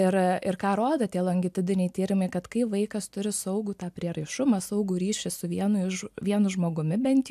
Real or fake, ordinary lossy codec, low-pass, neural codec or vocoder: real; AAC, 96 kbps; 14.4 kHz; none